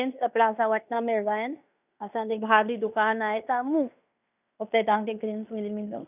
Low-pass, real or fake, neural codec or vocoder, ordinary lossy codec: 3.6 kHz; fake; codec, 16 kHz in and 24 kHz out, 0.9 kbps, LongCat-Audio-Codec, fine tuned four codebook decoder; none